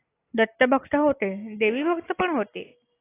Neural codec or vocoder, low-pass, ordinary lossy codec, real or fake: none; 3.6 kHz; AAC, 16 kbps; real